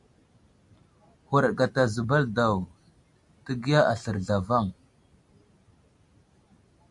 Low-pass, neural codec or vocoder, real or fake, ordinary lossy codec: 10.8 kHz; none; real; MP3, 96 kbps